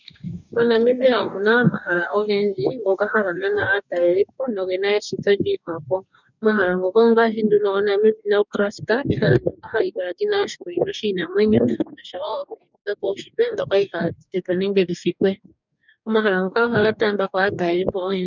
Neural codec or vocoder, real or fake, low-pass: codec, 44.1 kHz, 2.6 kbps, DAC; fake; 7.2 kHz